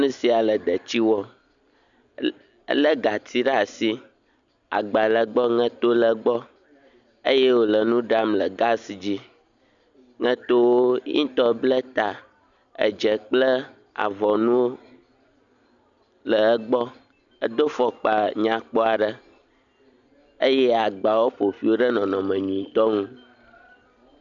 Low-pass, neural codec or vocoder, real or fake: 7.2 kHz; none; real